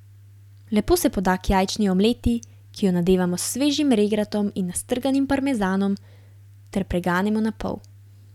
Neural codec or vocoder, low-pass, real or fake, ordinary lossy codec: none; 19.8 kHz; real; none